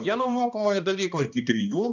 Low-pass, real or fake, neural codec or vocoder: 7.2 kHz; fake; codec, 16 kHz, 1 kbps, X-Codec, HuBERT features, trained on balanced general audio